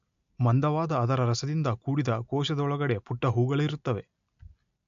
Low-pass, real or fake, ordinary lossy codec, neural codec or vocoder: 7.2 kHz; real; AAC, 64 kbps; none